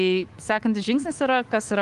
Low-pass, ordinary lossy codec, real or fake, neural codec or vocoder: 10.8 kHz; Opus, 24 kbps; fake; codec, 24 kHz, 3.1 kbps, DualCodec